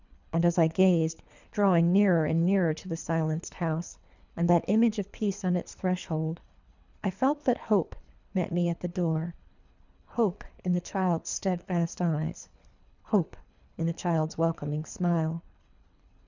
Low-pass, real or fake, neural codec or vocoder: 7.2 kHz; fake; codec, 24 kHz, 3 kbps, HILCodec